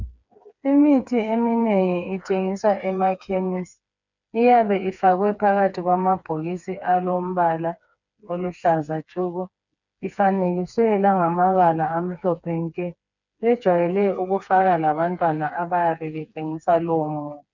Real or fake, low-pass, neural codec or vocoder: fake; 7.2 kHz; codec, 16 kHz, 4 kbps, FreqCodec, smaller model